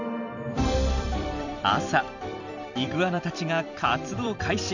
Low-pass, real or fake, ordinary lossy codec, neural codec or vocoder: 7.2 kHz; fake; none; vocoder, 44.1 kHz, 128 mel bands every 256 samples, BigVGAN v2